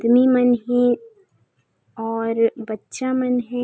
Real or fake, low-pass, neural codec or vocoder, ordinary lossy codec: real; none; none; none